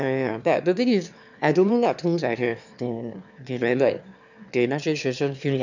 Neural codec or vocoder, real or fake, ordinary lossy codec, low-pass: autoencoder, 22.05 kHz, a latent of 192 numbers a frame, VITS, trained on one speaker; fake; none; 7.2 kHz